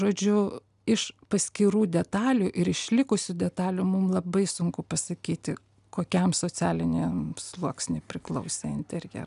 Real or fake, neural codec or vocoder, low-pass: real; none; 10.8 kHz